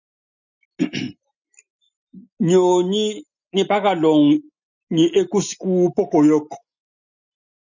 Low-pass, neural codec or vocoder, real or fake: 7.2 kHz; none; real